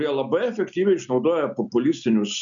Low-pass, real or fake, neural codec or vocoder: 7.2 kHz; real; none